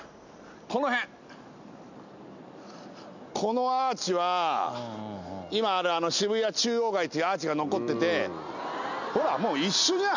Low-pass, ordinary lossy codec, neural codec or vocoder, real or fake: 7.2 kHz; none; none; real